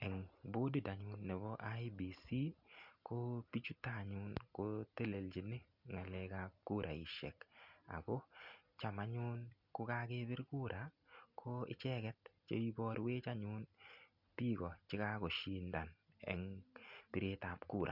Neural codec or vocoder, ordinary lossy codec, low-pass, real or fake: none; none; 5.4 kHz; real